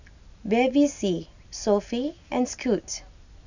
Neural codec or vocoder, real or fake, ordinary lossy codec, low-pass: none; real; none; 7.2 kHz